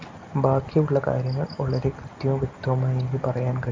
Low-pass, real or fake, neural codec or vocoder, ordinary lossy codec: 7.2 kHz; real; none; Opus, 24 kbps